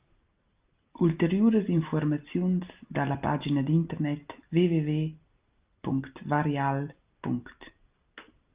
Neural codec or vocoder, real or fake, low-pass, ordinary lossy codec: none; real; 3.6 kHz; Opus, 32 kbps